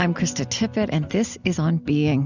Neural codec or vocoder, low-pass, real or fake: none; 7.2 kHz; real